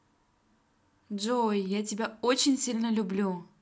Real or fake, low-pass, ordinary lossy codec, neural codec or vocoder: real; none; none; none